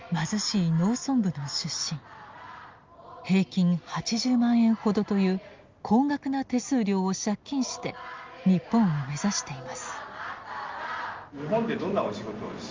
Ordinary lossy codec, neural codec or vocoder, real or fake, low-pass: Opus, 32 kbps; none; real; 7.2 kHz